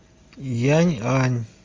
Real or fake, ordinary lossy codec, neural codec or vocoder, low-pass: real; Opus, 32 kbps; none; 7.2 kHz